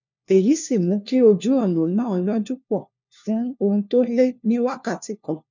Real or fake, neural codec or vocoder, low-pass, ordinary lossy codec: fake; codec, 16 kHz, 1 kbps, FunCodec, trained on LibriTTS, 50 frames a second; 7.2 kHz; none